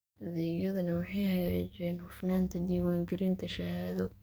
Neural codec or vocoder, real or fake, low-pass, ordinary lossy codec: codec, 44.1 kHz, 2.6 kbps, SNAC; fake; none; none